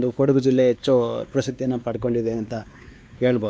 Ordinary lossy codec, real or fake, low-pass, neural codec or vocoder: none; fake; none; codec, 16 kHz, 2 kbps, X-Codec, WavLM features, trained on Multilingual LibriSpeech